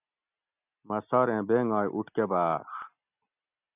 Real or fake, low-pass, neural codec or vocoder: real; 3.6 kHz; none